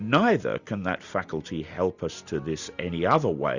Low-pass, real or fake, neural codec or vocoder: 7.2 kHz; real; none